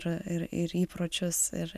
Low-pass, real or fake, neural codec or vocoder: 14.4 kHz; real; none